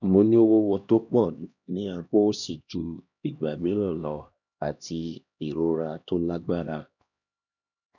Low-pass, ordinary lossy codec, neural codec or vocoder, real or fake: 7.2 kHz; none; codec, 16 kHz, 1 kbps, X-Codec, HuBERT features, trained on LibriSpeech; fake